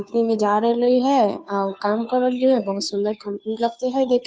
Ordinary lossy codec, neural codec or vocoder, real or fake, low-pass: none; codec, 16 kHz, 2 kbps, FunCodec, trained on Chinese and English, 25 frames a second; fake; none